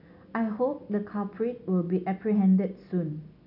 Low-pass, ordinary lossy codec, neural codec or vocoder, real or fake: 5.4 kHz; none; none; real